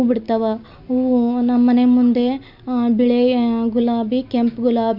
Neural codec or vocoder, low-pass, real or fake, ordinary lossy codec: none; 5.4 kHz; real; none